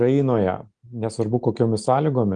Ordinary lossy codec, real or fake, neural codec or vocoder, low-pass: Opus, 32 kbps; real; none; 9.9 kHz